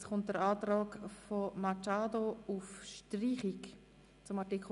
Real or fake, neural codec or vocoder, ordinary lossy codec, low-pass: real; none; none; 10.8 kHz